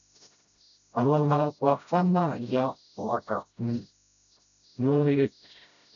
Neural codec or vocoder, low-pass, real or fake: codec, 16 kHz, 0.5 kbps, FreqCodec, smaller model; 7.2 kHz; fake